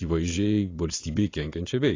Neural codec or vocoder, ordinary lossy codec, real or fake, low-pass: vocoder, 22.05 kHz, 80 mel bands, Vocos; AAC, 32 kbps; fake; 7.2 kHz